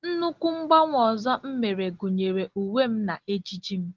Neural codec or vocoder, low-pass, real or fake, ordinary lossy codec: none; 7.2 kHz; real; Opus, 16 kbps